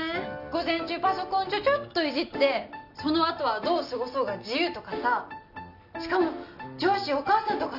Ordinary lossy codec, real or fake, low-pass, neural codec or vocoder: none; real; 5.4 kHz; none